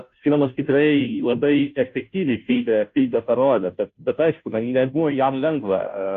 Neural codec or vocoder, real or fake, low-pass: codec, 16 kHz, 0.5 kbps, FunCodec, trained on Chinese and English, 25 frames a second; fake; 7.2 kHz